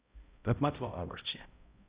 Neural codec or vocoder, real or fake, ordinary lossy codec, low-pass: codec, 16 kHz, 0.5 kbps, X-Codec, HuBERT features, trained on balanced general audio; fake; Opus, 64 kbps; 3.6 kHz